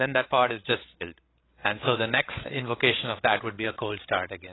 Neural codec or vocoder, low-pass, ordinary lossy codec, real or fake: codec, 16 kHz, 16 kbps, FreqCodec, larger model; 7.2 kHz; AAC, 16 kbps; fake